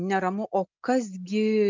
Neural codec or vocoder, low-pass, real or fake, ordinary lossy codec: none; 7.2 kHz; real; MP3, 64 kbps